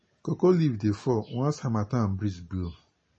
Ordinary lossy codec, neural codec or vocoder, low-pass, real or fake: MP3, 32 kbps; none; 10.8 kHz; real